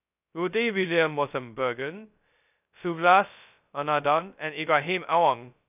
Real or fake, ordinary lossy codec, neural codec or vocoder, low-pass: fake; none; codec, 16 kHz, 0.2 kbps, FocalCodec; 3.6 kHz